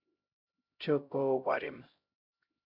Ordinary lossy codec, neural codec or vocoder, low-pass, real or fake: MP3, 48 kbps; codec, 16 kHz, 0.5 kbps, X-Codec, HuBERT features, trained on LibriSpeech; 5.4 kHz; fake